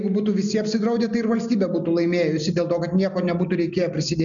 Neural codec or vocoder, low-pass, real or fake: none; 7.2 kHz; real